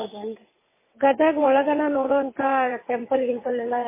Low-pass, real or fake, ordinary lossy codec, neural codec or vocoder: 3.6 kHz; fake; MP3, 16 kbps; vocoder, 22.05 kHz, 80 mel bands, WaveNeXt